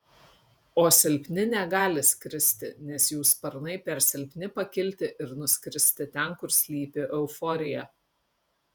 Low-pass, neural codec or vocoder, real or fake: 19.8 kHz; vocoder, 48 kHz, 128 mel bands, Vocos; fake